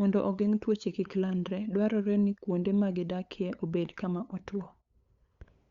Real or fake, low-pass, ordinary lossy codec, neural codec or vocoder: fake; 7.2 kHz; Opus, 64 kbps; codec, 16 kHz, 8 kbps, FunCodec, trained on LibriTTS, 25 frames a second